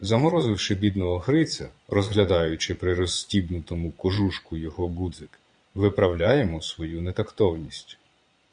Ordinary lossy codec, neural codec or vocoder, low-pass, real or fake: Opus, 64 kbps; vocoder, 22.05 kHz, 80 mel bands, Vocos; 9.9 kHz; fake